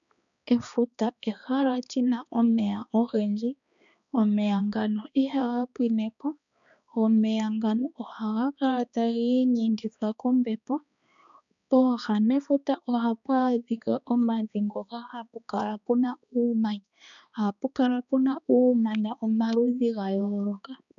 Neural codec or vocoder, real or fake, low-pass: codec, 16 kHz, 2 kbps, X-Codec, HuBERT features, trained on balanced general audio; fake; 7.2 kHz